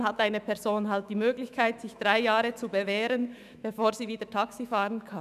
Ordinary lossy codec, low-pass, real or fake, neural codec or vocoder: none; 14.4 kHz; fake; autoencoder, 48 kHz, 128 numbers a frame, DAC-VAE, trained on Japanese speech